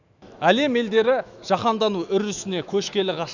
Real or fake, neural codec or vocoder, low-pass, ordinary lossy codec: fake; autoencoder, 48 kHz, 128 numbers a frame, DAC-VAE, trained on Japanese speech; 7.2 kHz; none